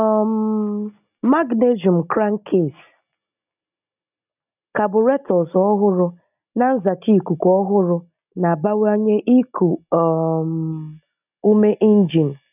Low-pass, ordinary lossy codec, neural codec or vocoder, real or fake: 3.6 kHz; none; none; real